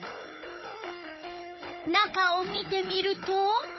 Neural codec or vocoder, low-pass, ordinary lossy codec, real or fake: codec, 16 kHz, 16 kbps, FunCodec, trained on Chinese and English, 50 frames a second; 7.2 kHz; MP3, 24 kbps; fake